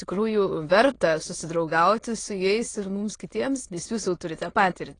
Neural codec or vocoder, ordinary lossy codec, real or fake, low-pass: autoencoder, 22.05 kHz, a latent of 192 numbers a frame, VITS, trained on many speakers; AAC, 32 kbps; fake; 9.9 kHz